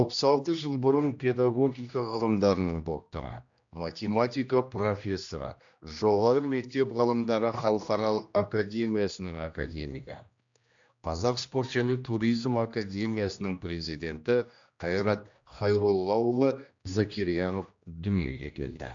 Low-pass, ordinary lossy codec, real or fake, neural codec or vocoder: 7.2 kHz; AAC, 64 kbps; fake; codec, 16 kHz, 1 kbps, X-Codec, HuBERT features, trained on general audio